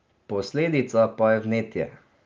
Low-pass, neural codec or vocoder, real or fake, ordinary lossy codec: 7.2 kHz; none; real; Opus, 24 kbps